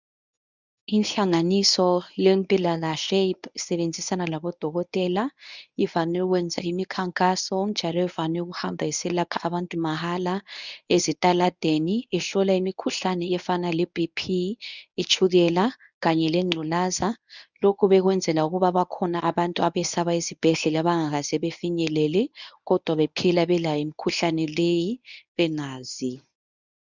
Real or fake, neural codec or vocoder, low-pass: fake; codec, 24 kHz, 0.9 kbps, WavTokenizer, medium speech release version 1; 7.2 kHz